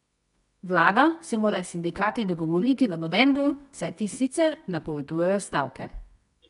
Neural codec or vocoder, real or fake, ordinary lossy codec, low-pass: codec, 24 kHz, 0.9 kbps, WavTokenizer, medium music audio release; fake; none; 10.8 kHz